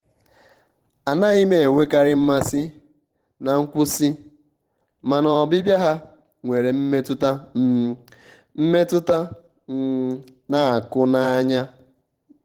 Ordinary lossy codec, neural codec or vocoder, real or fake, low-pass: Opus, 16 kbps; vocoder, 44.1 kHz, 128 mel bands every 512 samples, BigVGAN v2; fake; 19.8 kHz